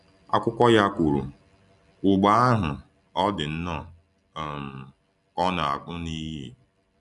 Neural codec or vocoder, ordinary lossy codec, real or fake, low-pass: none; none; real; 10.8 kHz